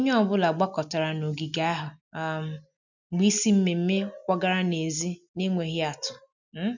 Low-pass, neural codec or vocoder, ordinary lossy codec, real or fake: 7.2 kHz; none; none; real